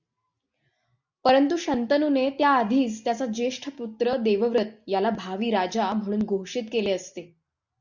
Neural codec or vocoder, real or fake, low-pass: none; real; 7.2 kHz